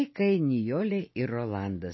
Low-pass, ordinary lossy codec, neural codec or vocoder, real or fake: 7.2 kHz; MP3, 24 kbps; none; real